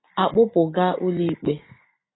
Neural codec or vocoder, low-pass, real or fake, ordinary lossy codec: none; 7.2 kHz; real; AAC, 16 kbps